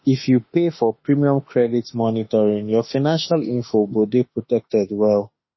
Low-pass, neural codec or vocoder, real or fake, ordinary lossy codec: 7.2 kHz; autoencoder, 48 kHz, 32 numbers a frame, DAC-VAE, trained on Japanese speech; fake; MP3, 24 kbps